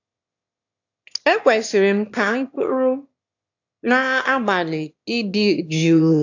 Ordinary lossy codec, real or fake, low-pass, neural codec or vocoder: AAC, 48 kbps; fake; 7.2 kHz; autoencoder, 22.05 kHz, a latent of 192 numbers a frame, VITS, trained on one speaker